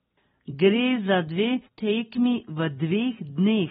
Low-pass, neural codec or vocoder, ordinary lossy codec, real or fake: 19.8 kHz; vocoder, 44.1 kHz, 128 mel bands every 256 samples, BigVGAN v2; AAC, 16 kbps; fake